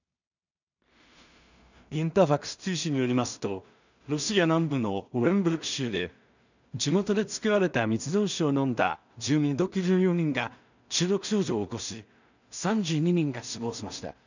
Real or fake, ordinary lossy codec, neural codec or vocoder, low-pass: fake; none; codec, 16 kHz in and 24 kHz out, 0.4 kbps, LongCat-Audio-Codec, two codebook decoder; 7.2 kHz